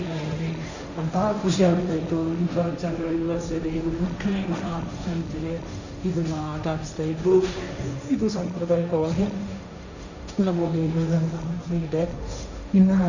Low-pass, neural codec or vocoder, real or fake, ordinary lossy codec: 7.2 kHz; codec, 16 kHz, 1.1 kbps, Voila-Tokenizer; fake; none